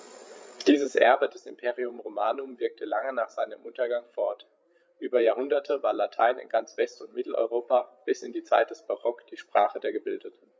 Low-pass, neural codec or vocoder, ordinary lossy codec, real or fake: none; codec, 16 kHz, 8 kbps, FreqCodec, larger model; none; fake